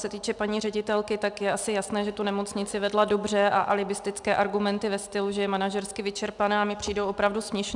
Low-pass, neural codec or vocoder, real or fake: 10.8 kHz; none; real